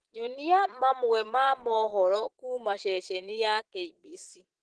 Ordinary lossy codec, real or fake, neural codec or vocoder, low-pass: Opus, 16 kbps; fake; vocoder, 22.05 kHz, 80 mel bands, Vocos; 9.9 kHz